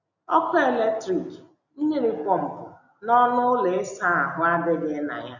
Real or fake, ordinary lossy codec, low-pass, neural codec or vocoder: real; none; 7.2 kHz; none